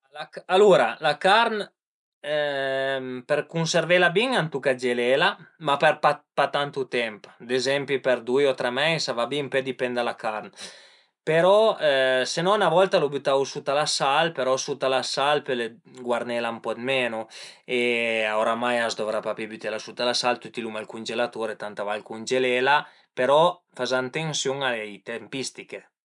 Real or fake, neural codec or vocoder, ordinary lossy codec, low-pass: real; none; none; 10.8 kHz